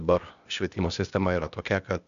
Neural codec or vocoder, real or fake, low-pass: codec, 16 kHz, 0.8 kbps, ZipCodec; fake; 7.2 kHz